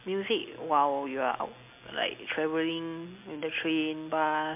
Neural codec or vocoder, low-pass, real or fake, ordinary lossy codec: none; 3.6 kHz; real; MP3, 32 kbps